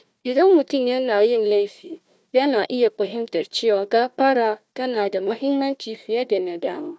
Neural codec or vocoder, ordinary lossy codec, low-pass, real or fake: codec, 16 kHz, 1 kbps, FunCodec, trained on Chinese and English, 50 frames a second; none; none; fake